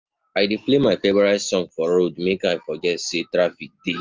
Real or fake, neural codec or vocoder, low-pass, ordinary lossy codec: real; none; 7.2 kHz; Opus, 24 kbps